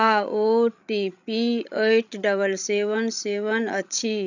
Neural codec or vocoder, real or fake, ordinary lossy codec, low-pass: codec, 16 kHz, 16 kbps, FreqCodec, larger model; fake; none; 7.2 kHz